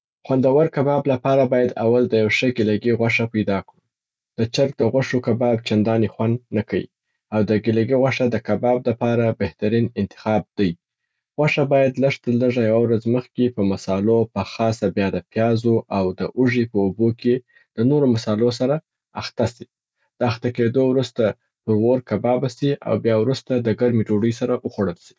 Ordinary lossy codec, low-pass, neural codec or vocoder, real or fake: none; none; none; real